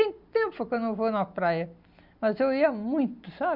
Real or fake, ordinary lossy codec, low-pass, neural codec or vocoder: real; none; 5.4 kHz; none